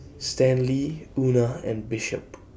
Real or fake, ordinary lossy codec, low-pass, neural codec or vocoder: real; none; none; none